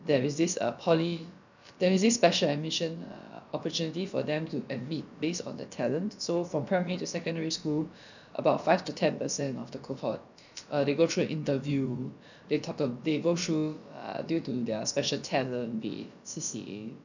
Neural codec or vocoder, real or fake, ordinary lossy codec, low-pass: codec, 16 kHz, about 1 kbps, DyCAST, with the encoder's durations; fake; none; 7.2 kHz